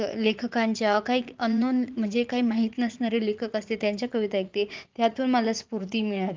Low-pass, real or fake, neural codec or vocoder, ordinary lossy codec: 7.2 kHz; fake; vocoder, 44.1 kHz, 80 mel bands, Vocos; Opus, 24 kbps